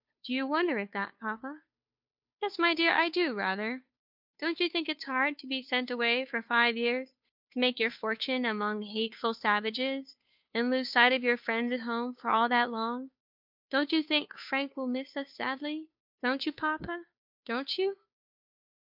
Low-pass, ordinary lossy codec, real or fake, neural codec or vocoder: 5.4 kHz; MP3, 48 kbps; fake; codec, 16 kHz, 2 kbps, FunCodec, trained on Chinese and English, 25 frames a second